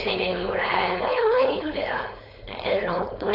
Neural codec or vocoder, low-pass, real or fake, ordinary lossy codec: codec, 16 kHz, 4.8 kbps, FACodec; 5.4 kHz; fake; AAC, 48 kbps